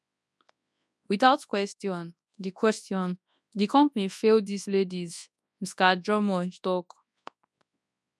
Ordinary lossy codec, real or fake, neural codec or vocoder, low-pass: none; fake; codec, 24 kHz, 0.9 kbps, WavTokenizer, large speech release; none